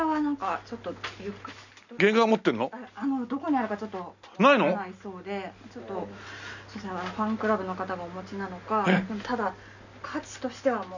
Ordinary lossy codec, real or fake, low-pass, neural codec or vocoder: none; real; 7.2 kHz; none